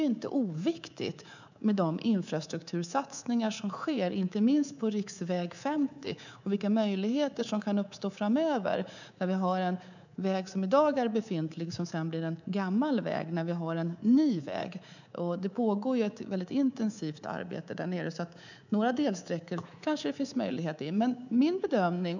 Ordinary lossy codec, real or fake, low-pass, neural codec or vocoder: none; fake; 7.2 kHz; codec, 24 kHz, 3.1 kbps, DualCodec